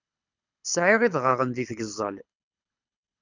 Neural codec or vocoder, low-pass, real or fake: codec, 24 kHz, 6 kbps, HILCodec; 7.2 kHz; fake